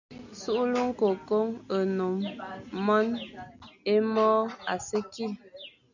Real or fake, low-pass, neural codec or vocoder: real; 7.2 kHz; none